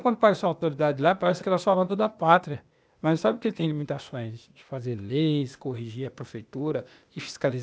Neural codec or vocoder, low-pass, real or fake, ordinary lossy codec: codec, 16 kHz, 0.8 kbps, ZipCodec; none; fake; none